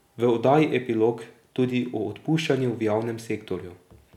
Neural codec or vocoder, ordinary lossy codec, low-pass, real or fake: none; none; 19.8 kHz; real